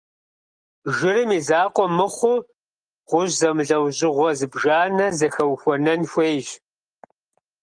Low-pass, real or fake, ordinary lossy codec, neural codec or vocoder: 9.9 kHz; real; Opus, 32 kbps; none